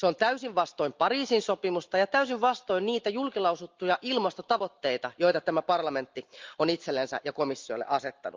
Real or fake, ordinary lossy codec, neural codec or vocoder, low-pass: real; Opus, 24 kbps; none; 7.2 kHz